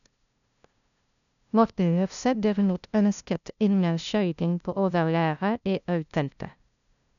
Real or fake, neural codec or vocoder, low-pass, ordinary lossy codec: fake; codec, 16 kHz, 0.5 kbps, FunCodec, trained on LibriTTS, 25 frames a second; 7.2 kHz; none